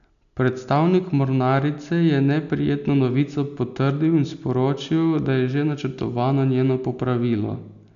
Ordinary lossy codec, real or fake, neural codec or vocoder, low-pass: none; real; none; 7.2 kHz